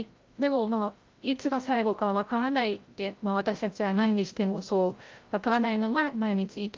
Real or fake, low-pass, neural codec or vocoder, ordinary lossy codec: fake; 7.2 kHz; codec, 16 kHz, 0.5 kbps, FreqCodec, larger model; Opus, 32 kbps